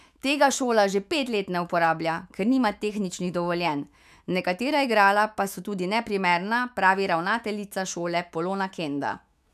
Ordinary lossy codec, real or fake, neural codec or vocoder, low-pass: none; fake; autoencoder, 48 kHz, 128 numbers a frame, DAC-VAE, trained on Japanese speech; 14.4 kHz